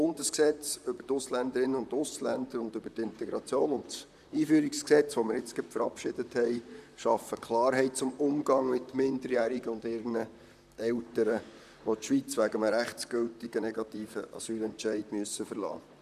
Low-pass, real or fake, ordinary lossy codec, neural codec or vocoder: 14.4 kHz; fake; none; vocoder, 44.1 kHz, 128 mel bands, Pupu-Vocoder